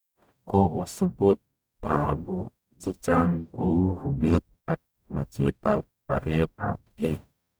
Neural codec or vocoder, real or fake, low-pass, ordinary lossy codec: codec, 44.1 kHz, 0.9 kbps, DAC; fake; none; none